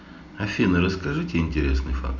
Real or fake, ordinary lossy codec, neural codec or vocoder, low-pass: real; none; none; 7.2 kHz